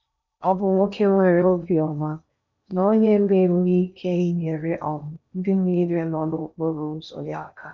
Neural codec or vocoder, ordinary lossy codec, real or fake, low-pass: codec, 16 kHz in and 24 kHz out, 0.8 kbps, FocalCodec, streaming, 65536 codes; none; fake; 7.2 kHz